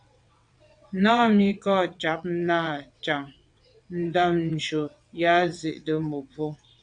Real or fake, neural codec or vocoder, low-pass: fake; vocoder, 22.05 kHz, 80 mel bands, WaveNeXt; 9.9 kHz